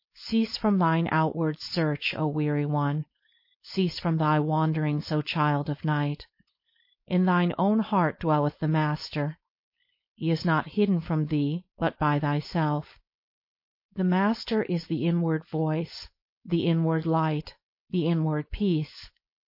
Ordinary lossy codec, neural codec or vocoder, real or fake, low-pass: MP3, 32 kbps; codec, 16 kHz, 4.8 kbps, FACodec; fake; 5.4 kHz